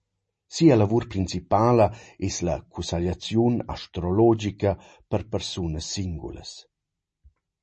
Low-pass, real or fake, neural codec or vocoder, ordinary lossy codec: 10.8 kHz; real; none; MP3, 32 kbps